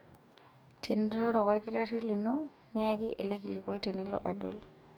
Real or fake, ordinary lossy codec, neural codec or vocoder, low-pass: fake; none; codec, 44.1 kHz, 2.6 kbps, DAC; none